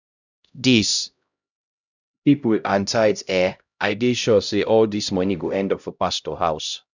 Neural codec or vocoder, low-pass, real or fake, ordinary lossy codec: codec, 16 kHz, 0.5 kbps, X-Codec, HuBERT features, trained on LibriSpeech; 7.2 kHz; fake; none